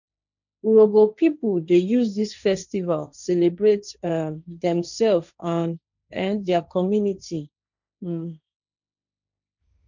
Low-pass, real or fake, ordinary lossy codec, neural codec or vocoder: 7.2 kHz; fake; none; codec, 16 kHz, 1.1 kbps, Voila-Tokenizer